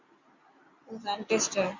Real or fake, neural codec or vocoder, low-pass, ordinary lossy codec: real; none; 7.2 kHz; Opus, 64 kbps